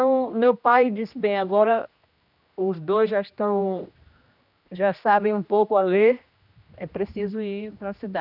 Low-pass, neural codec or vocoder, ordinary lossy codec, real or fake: 5.4 kHz; codec, 16 kHz, 1 kbps, X-Codec, HuBERT features, trained on general audio; none; fake